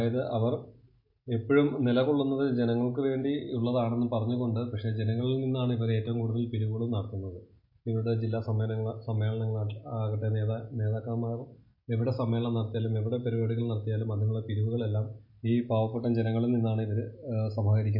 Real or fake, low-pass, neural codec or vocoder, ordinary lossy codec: real; 5.4 kHz; none; MP3, 32 kbps